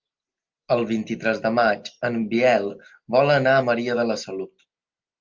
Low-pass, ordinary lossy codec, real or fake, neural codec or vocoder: 7.2 kHz; Opus, 24 kbps; real; none